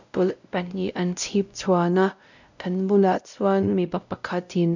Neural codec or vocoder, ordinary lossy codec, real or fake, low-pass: codec, 16 kHz, 0.5 kbps, X-Codec, WavLM features, trained on Multilingual LibriSpeech; none; fake; 7.2 kHz